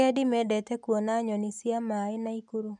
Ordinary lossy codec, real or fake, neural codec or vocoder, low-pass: none; real; none; 10.8 kHz